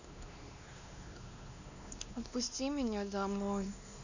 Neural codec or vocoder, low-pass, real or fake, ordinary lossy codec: codec, 16 kHz, 2 kbps, X-Codec, WavLM features, trained on Multilingual LibriSpeech; 7.2 kHz; fake; none